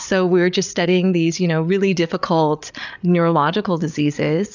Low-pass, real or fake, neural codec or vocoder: 7.2 kHz; fake; codec, 16 kHz, 8 kbps, FreqCodec, larger model